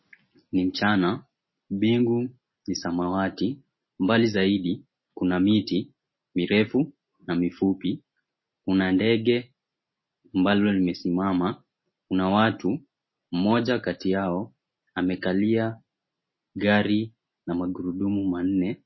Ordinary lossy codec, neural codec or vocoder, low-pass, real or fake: MP3, 24 kbps; none; 7.2 kHz; real